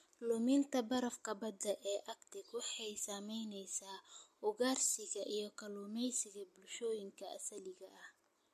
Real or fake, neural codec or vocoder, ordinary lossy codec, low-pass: real; none; MP3, 64 kbps; 14.4 kHz